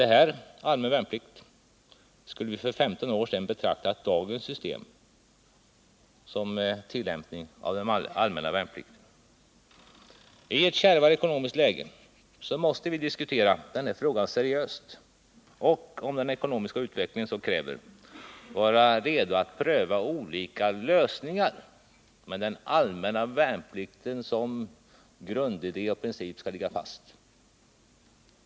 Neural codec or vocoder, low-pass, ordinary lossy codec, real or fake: none; none; none; real